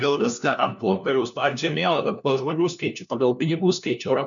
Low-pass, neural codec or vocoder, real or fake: 7.2 kHz; codec, 16 kHz, 1 kbps, FunCodec, trained on LibriTTS, 50 frames a second; fake